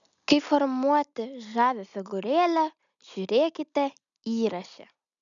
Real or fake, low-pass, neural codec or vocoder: real; 7.2 kHz; none